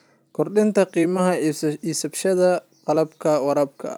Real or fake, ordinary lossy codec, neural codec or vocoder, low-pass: fake; none; vocoder, 44.1 kHz, 128 mel bands every 256 samples, BigVGAN v2; none